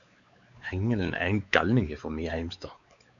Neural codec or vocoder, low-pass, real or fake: codec, 16 kHz, 4 kbps, X-Codec, WavLM features, trained on Multilingual LibriSpeech; 7.2 kHz; fake